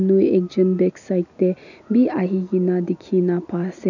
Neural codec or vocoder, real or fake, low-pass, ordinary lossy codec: none; real; 7.2 kHz; none